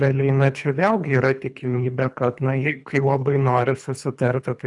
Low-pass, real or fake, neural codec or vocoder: 10.8 kHz; fake; codec, 24 kHz, 3 kbps, HILCodec